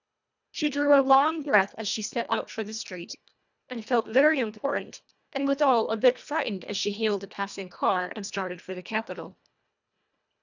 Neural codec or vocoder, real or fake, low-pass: codec, 24 kHz, 1.5 kbps, HILCodec; fake; 7.2 kHz